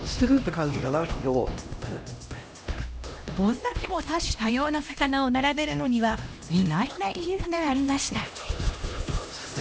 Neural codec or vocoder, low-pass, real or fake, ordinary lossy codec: codec, 16 kHz, 1 kbps, X-Codec, HuBERT features, trained on LibriSpeech; none; fake; none